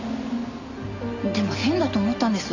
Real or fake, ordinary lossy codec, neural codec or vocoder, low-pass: real; AAC, 48 kbps; none; 7.2 kHz